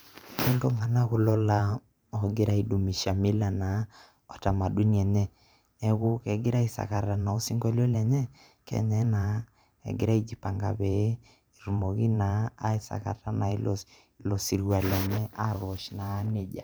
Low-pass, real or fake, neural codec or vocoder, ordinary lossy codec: none; fake; vocoder, 44.1 kHz, 128 mel bands every 512 samples, BigVGAN v2; none